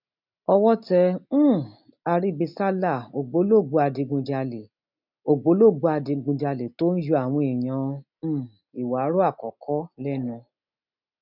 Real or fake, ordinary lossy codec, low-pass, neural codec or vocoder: real; none; 5.4 kHz; none